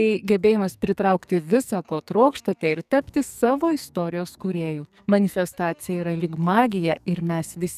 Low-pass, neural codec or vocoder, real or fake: 14.4 kHz; codec, 44.1 kHz, 2.6 kbps, SNAC; fake